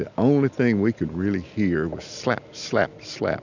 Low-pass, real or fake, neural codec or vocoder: 7.2 kHz; real; none